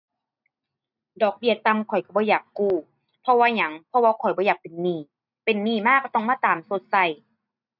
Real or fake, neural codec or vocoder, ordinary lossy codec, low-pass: real; none; none; 5.4 kHz